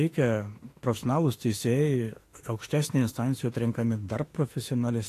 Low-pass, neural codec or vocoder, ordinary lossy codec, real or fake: 14.4 kHz; autoencoder, 48 kHz, 32 numbers a frame, DAC-VAE, trained on Japanese speech; AAC, 48 kbps; fake